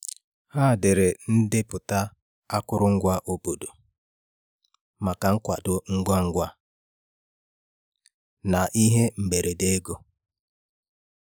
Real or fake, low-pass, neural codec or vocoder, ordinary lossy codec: real; none; none; none